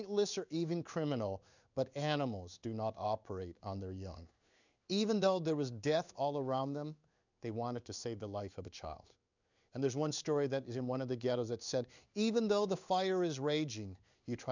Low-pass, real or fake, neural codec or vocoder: 7.2 kHz; fake; codec, 16 kHz in and 24 kHz out, 1 kbps, XY-Tokenizer